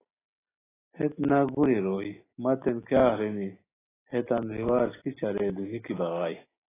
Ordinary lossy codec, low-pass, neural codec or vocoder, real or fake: AAC, 16 kbps; 3.6 kHz; none; real